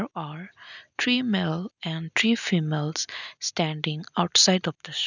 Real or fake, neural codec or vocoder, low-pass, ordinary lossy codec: real; none; 7.2 kHz; none